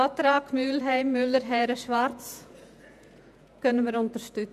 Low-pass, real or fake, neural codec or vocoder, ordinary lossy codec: 14.4 kHz; fake; vocoder, 48 kHz, 128 mel bands, Vocos; none